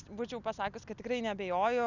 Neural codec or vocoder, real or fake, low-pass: none; real; 7.2 kHz